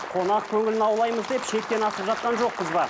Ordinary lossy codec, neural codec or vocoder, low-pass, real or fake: none; none; none; real